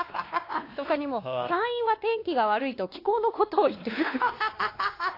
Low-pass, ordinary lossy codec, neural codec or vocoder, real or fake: 5.4 kHz; AAC, 32 kbps; codec, 16 kHz, 2 kbps, X-Codec, WavLM features, trained on Multilingual LibriSpeech; fake